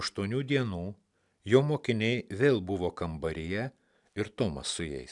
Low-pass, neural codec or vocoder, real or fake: 10.8 kHz; none; real